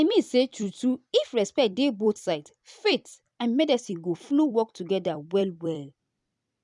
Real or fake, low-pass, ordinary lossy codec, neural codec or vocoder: fake; 10.8 kHz; none; vocoder, 44.1 kHz, 128 mel bands every 256 samples, BigVGAN v2